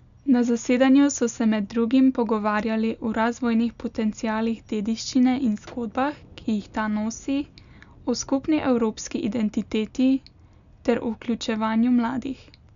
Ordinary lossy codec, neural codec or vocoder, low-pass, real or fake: none; none; 7.2 kHz; real